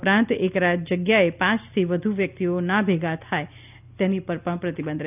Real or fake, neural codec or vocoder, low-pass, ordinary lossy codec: real; none; 3.6 kHz; none